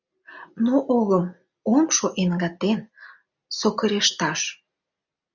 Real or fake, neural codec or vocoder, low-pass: real; none; 7.2 kHz